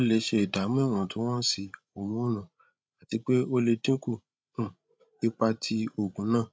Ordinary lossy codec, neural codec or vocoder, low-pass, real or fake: none; codec, 16 kHz, 16 kbps, FreqCodec, larger model; none; fake